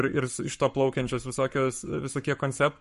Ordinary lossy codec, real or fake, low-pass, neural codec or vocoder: MP3, 48 kbps; fake; 14.4 kHz; codec, 44.1 kHz, 7.8 kbps, Pupu-Codec